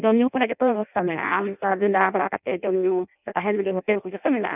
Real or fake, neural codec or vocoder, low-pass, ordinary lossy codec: fake; codec, 16 kHz in and 24 kHz out, 0.6 kbps, FireRedTTS-2 codec; 3.6 kHz; none